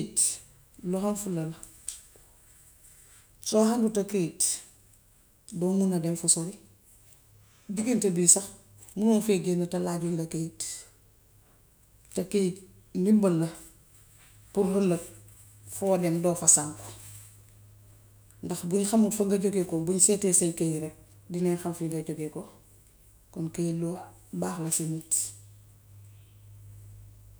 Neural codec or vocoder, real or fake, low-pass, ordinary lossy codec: autoencoder, 48 kHz, 128 numbers a frame, DAC-VAE, trained on Japanese speech; fake; none; none